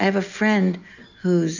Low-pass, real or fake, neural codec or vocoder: 7.2 kHz; real; none